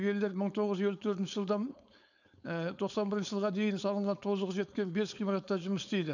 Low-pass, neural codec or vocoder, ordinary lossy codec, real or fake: 7.2 kHz; codec, 16 kHz, 4.8 kbps, FACodec; none; fake